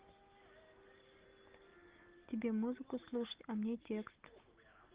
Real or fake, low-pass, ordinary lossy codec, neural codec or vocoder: real; 3.6 kHz; Opus, 16 kbps; none